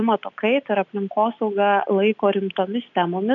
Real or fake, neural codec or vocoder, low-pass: real; none; 7.2 kHz